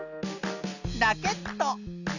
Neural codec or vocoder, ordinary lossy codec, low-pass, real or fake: none; none; 7.2 kHz; real